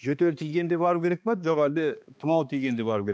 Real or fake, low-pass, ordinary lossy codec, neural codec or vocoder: fake; none; none; codec, 16 kHz, 2 kbps, X-Codec, HuBERT features, trained on balanced general audio